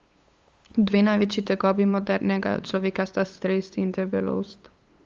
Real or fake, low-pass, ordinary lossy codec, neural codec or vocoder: fake; 7.2 kHz; Opus, 32 kbps; codec, 16 kHz, 8 kbps, FunCodec, trained on LibriTTS, 25 frames a second